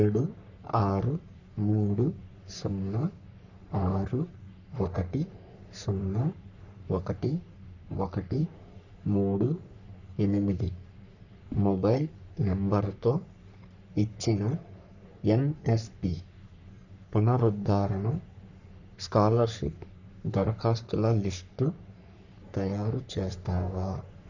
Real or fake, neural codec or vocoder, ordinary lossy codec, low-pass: fake; codec, 44.1 kHz, 3.4 kbps, Pupu-Codec; none; 7.2 kHz